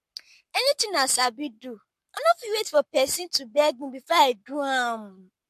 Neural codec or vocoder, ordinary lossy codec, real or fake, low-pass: codec, 44.1 kHz, 7.8 kbps, Pupu-Codec; MP3, 64 kbps; fake; 14.4 kHz